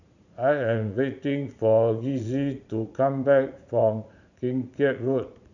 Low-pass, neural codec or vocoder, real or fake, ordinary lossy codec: 7.2 kHz; vocoder, 44.1 kHz, 80 mel bands, Vocos; fake; none